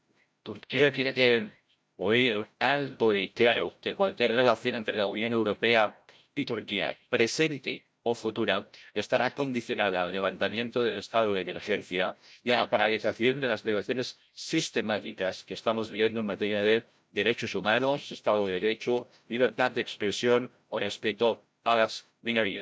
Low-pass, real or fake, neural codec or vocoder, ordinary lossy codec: none; fake; codec, 16 kHz, 0.5 kbps, FreqCodec, larger model; none